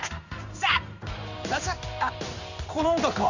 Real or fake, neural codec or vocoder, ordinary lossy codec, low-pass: fake; codec, 16 kHz in and 24 kHz out, 1 kbps, XY-Tokenizer; none; 7.2 kHz